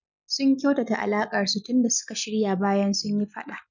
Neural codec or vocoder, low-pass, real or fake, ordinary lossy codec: none; 7.2 kHz; real; none